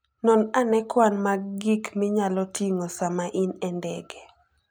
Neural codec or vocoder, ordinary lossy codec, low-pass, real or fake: none; none; none; real